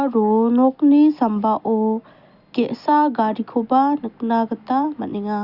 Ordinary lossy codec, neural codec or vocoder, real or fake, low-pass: Opus, 64 kbps; none; real; 5.4 kHz